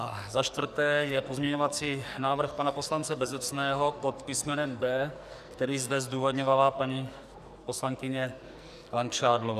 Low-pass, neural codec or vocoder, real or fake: 14.4 kHz; codec, 44.1 kHz, 2.6 kbps, SNAC; fake